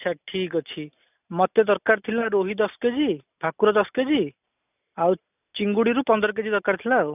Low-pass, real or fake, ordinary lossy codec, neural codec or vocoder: 3.6 kHz; real; none; none